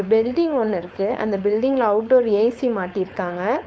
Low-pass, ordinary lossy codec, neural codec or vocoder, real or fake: none; none; codec, 16 kHz, 4.8 kbps, FACodec; fake